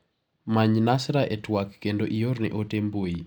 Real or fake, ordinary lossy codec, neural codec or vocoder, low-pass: real; none; none; 19.8 kHz